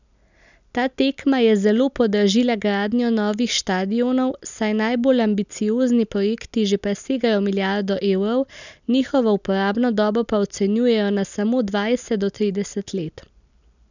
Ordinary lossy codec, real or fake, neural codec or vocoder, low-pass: none; real; none; 7.2 kHz